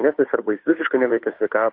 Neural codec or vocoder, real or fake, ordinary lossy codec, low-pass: codec, 16 kHz, 2 kbps, FunCodec, trained on Chinese and English, 25 frames a second; fake; MP3, 32 kbps; 5.4 kHz